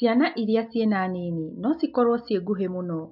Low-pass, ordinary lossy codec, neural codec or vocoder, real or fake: 5.4 kHz; MP3, 48 kbps; none; real